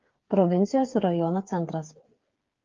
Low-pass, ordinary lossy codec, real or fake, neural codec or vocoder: 7.2 kHz; Opus, 32 kbps; fake; codec, 16 kHz, 8 kbps, FreqCodec, smaller model